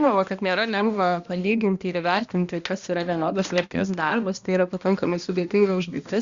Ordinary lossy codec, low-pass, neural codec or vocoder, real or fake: Opus, 64 kbps; 7.2 kHz; codec, 16 kHz, 1 kbps, X-Codec, HuBERT features, trained on balanced general audio; fake